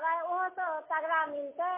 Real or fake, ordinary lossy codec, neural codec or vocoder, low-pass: real; MP3, 16 kbps; none; 3.6 kHz